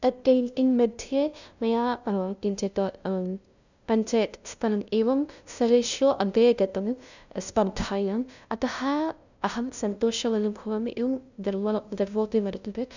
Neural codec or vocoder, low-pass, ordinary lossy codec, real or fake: codec, 16 kHz, 0.5 kbps, FunCodec, trained on LibriTTS, 25 frames a second; 7.2 kHz; none; fake